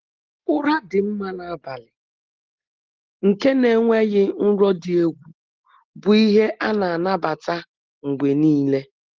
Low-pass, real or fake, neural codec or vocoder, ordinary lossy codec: 7.2 kHz; real; none; Opus, 16 kbps